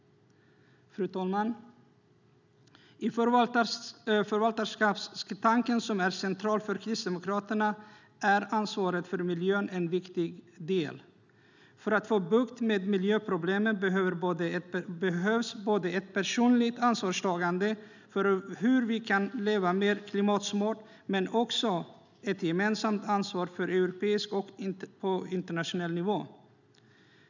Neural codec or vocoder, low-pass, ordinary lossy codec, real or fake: none; 7.2 kHz; none; real